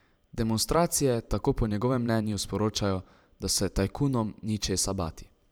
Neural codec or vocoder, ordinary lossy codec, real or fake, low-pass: vocoder, 44.1 kHz, 128 mel bands every 512 samples, BigVGAN v2; none; fake; none